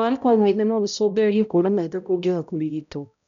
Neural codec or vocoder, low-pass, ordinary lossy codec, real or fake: codec, 16 kHz, 0.5 kbps, X-Codec, HuBERT features, trained on balanced general audio; 7.2 kHz; none; fake